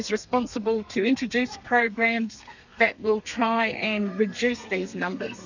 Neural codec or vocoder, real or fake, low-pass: codec, 32 kHz, 1.9 kbps, SNAC; fake; 7.2 kHz